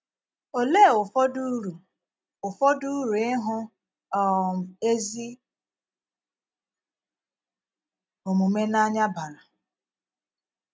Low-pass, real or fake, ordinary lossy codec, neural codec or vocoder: none; real; none; none